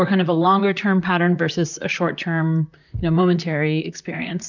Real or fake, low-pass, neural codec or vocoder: fake; 7.2 kHz; vocoder, 44.1 kHz, 128 mel bands, Pupu-Vocoder